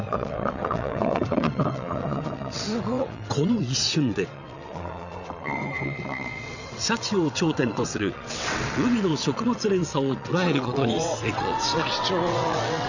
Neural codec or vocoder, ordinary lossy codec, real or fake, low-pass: vocoder, 22.05 kHz, 80 mel bands, WaveNeXt; none; fake; 7.2 kHz